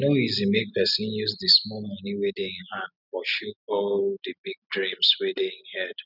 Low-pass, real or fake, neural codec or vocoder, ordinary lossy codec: 5.4 kHz; real; none; none